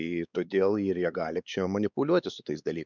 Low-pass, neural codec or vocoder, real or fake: 7.2 kHz; codec, 16 kHz, 4 kbps, X-Codec, WavLM features, trained on Multilingual LibriSpeech; fake